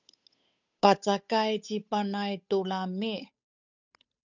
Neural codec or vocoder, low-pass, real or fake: codec, 16 kHz, 8 kbps, FunCodec, trained on Chinese and English, 25 frames a second; 7.2 kHz; fake